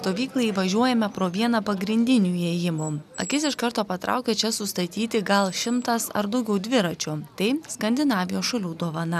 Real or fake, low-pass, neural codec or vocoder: real; 14.4 kHz; none